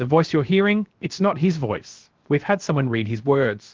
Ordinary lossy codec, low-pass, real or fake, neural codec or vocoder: Opus, 16 kbps; 7.2 kHz; fake; codec, 16 kHz, 0.7 kbps, FocalCodec